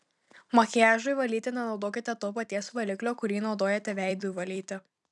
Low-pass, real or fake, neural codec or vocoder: 10.8 kHz; fake; vocoder, 44.1 kHz, 128 mel bands every 256 samples, BigVGAN v2